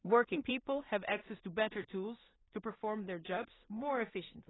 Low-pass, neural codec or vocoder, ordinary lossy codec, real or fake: 7.2 kHz; codec, 16 kHz in and 24 kHz out, 0.4 kbps, LongCat-Audio-Codec, two codebook decoder; AAC, 16 kbps; fake